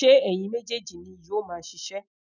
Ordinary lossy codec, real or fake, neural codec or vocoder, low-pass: none; real; none; 7.2 kHz